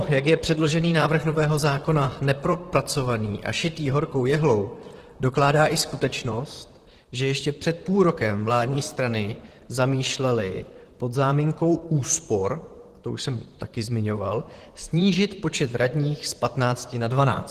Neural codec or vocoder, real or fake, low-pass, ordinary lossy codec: vocoder, 44.1 kHz, 128 mel bands, Pupu-Vocoder; fake; 14.4 kHz; Opus, 16 kbps